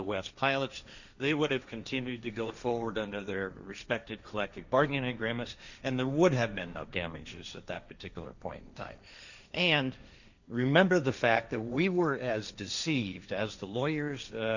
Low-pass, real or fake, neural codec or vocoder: 7.2 kHz; fake; codec, 16 kHz, 1.1 kbps, Voila-Tokenizer